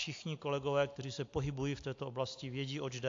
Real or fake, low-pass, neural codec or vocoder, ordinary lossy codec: real; 7.2 kHz; none; AAC, 48 kbps